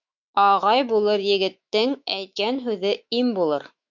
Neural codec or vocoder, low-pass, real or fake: autoencoder, 48 kHz, 128 numbers a frame, DAC-VAE, trained on Japanese speech; 7.2 kHz; fake